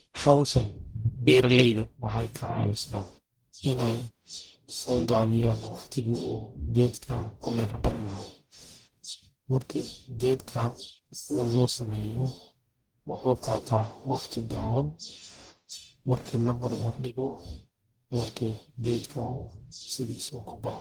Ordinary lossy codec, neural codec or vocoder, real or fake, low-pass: Opus, 24 kbps; codec, 44.1 kHz, 0.9 kbps, DAC; fake; 19.8 kHz